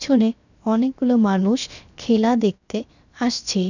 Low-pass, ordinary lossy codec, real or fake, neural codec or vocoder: 7.2 kHz; none; fake; codec, 16 kHz, 0.7 kbps, FocalCodec